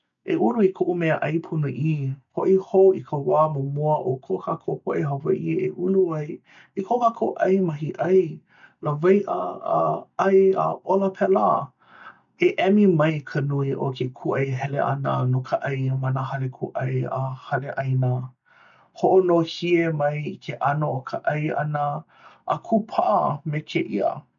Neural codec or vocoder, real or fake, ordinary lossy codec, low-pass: none; real; none; 7.2 kHz